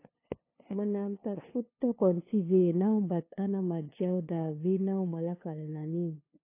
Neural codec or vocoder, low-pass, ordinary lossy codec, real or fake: codec, 16 kHz, 2 kbps, FunCodec, trained on LibriTTS, 25 frames a second; 3.6 kHz; AAC, 24 kbps; fake